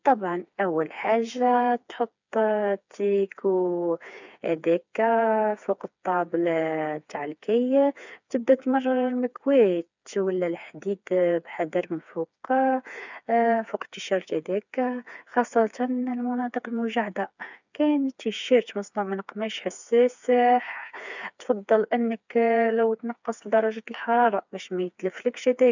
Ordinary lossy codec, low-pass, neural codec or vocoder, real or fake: none; 7.2 kHz; codec, 16 kHz, 4 kbps, FreqCodec, smaller model; fake